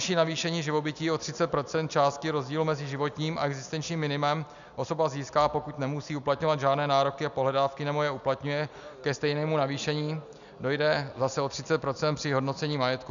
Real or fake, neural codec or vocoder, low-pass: real; none; 7.2 kHz